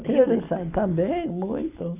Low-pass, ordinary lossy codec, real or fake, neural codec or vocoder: 3.6 kHz; none; real; none